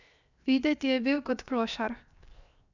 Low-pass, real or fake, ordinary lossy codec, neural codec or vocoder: 7.2 kHz; fake; none; codec, 16 kHz, 0.7 kbps, FocalCodec